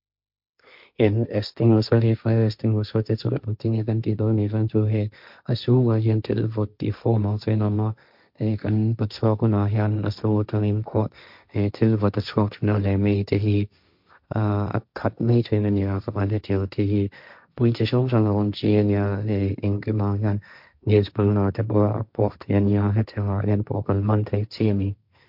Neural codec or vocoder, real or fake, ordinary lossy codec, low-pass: codec, 16 kHz, 1.1 kbps, Voila-Tokenizer; fake; MP3, 48 kbps; 5.4 kHz